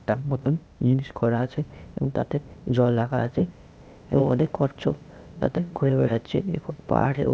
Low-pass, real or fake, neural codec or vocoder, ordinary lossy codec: none; fake; codec, 16 kHz, 0.8 kbps, ZipCodec; none